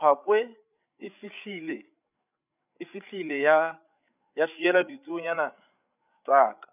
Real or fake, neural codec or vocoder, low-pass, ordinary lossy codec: fake; codec, 16 kHz, 8 kbps, FreqCodec, larger model; 3.6 kHz; none